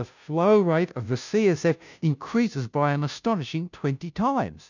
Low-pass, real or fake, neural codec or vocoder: 7.2 kHz; fake; codec, 16 kHz, 0.5 kbps, FunCodec, trained on Chinese and English, 25 frames a second